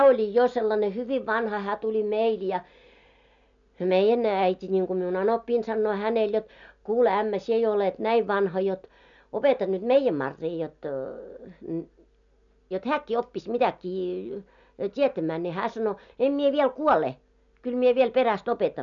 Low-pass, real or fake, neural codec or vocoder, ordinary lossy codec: 7.2 kHz; real; none; none